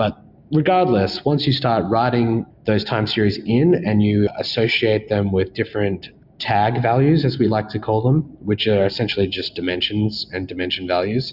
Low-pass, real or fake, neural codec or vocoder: 5.4 kHz; real; none